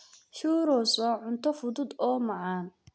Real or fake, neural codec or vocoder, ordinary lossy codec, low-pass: real; none; none; none